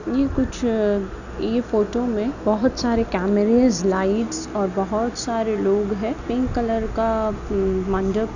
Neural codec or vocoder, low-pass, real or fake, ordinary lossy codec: none; 7.2 kHz; real; none